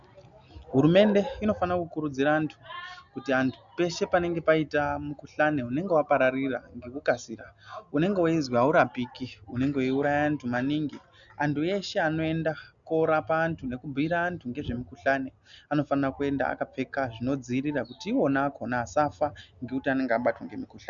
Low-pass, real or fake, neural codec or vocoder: 7.2 kHz; real; none